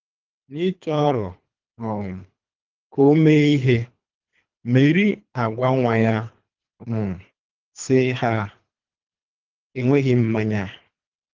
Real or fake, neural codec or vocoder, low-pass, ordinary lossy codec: fake; codec, 24 kHz, 3 kbps, HILCodec; 7.2 kHz; Opus, 32 kbps